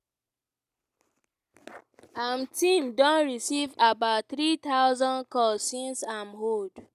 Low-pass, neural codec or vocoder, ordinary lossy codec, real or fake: 14.4 kHz; none; none; real